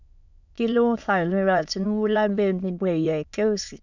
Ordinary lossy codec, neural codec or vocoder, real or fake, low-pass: none; autoencoder, 22.05 kHz, a latent of 192 numbers a frame, VITS, trained on many speakers; fake; 7.2 kHz